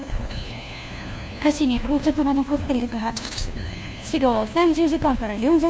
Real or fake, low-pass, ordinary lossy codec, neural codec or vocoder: fake; none; none; codec, 16 kHz, 1 kbps, FunCodec, trained on LibriTTS, 50 frames a second